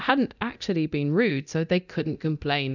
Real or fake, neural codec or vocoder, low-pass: fake; codec, 24 kHz, 0.9 kbps, DualCodec; 7.2 kHz